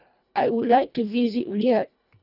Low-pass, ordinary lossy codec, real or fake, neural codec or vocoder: 5.4 kHz; none; fake; codec, 24 kHz, 1.5 kbps, HILCodec